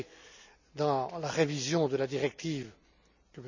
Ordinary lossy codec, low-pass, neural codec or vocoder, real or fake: none; 7.2 kHz; none; real